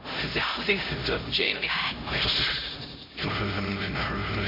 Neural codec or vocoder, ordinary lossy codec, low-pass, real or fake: codec, 16 kHz, 0.5 kbps, X-Codec, HuBERT features, trained on LibriSpeech; MP3, 32 kbps; 5.4 kHz; fake